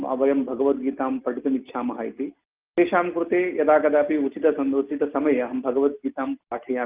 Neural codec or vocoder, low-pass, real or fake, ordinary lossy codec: none; 3.6 kHz; real; Opus, 32 kbps